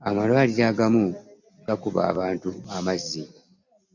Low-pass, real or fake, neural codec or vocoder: 7.2 kHz; real; none